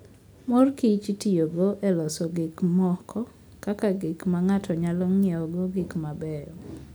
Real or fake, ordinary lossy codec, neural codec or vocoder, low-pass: real; none; none; none